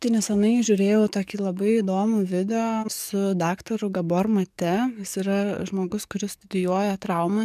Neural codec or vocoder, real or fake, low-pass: codec, 44.1 kHz, 7.8 kbps, DAC; fake; 14.4 kHz